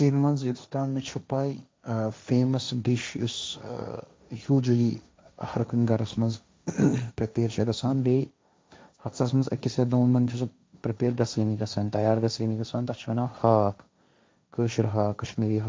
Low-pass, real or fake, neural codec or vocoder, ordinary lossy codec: none; fake; codec, 16 kHz, 1.1 kbps, Voila-Tokenizer; none